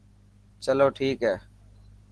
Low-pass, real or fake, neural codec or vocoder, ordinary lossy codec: 10.8 kHz; real; none; Opus, 16 kbps